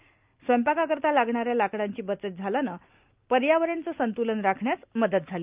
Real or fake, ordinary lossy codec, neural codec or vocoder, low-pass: real; Opus, 24 kbps; none; 3.6 kHz